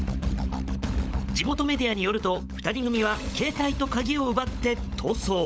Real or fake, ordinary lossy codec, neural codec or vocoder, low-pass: fake; none; codec, 16 kHz, 16 kbps, FunCodec, trained on LibriTTS, 50 frames a second; none